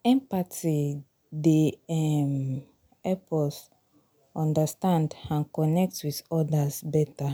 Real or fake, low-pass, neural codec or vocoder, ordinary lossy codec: real; none; none; none